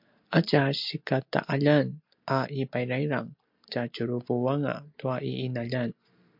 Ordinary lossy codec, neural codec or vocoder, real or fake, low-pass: MP3, 48 kbps; none; real; 5.4 kHz